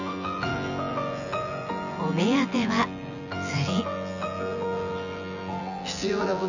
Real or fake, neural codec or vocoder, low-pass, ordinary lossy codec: fake; vocoder, 24 kHz, 100 mel bands, Vocos; 7.2 kHz; none